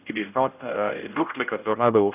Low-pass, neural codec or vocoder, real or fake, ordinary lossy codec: 3.6 kHz; codec, 16 kHz, 0.5 kbps, X-Codec, HuBERT features, trained on general audio; fake; none